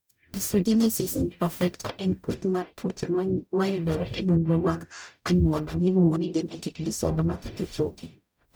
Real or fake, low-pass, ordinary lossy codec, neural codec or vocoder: fake; none; none; codec, 44.1 kHz, 0.9 kbps, DAC